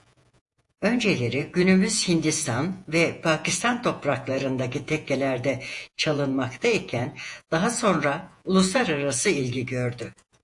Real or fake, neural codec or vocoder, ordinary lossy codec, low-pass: fake; vocoder, 48 kHz, 128 mel bands, Vocos; AAC, 64 kbps; 10.8 kHz